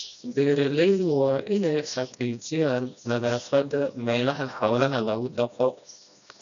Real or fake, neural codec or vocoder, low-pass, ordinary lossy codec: fake; codec, 16 kHz, 1 kbps, FreqCodec, smaller model; 7.2 kHz; none